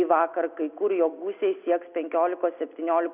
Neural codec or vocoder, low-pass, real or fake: none; 3.6 kHz; real